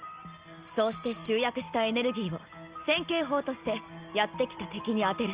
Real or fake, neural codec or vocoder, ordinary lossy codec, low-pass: real; none; Opus, 24 kbps; 3.6 kHz